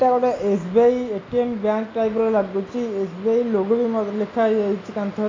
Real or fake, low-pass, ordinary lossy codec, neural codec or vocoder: real; 7.2 kHz; AAC, 32 kbps; none